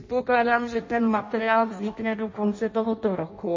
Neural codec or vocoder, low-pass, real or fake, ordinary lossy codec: codec, 16 kHz in and 24 kHz out, 0.6 kbps, FireRedTTS-2 codec; 7.2 kHz; fake; MP3, 32 kbps